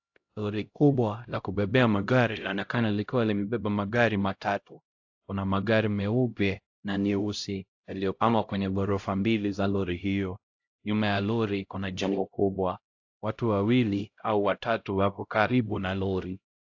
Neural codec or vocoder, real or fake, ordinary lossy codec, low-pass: codec, 16 kHz, 0.5 kbps, X-Codec, HuBERT features, trained on LibriSpeech; fake; AAC, 48 kbps; 7.2 kHz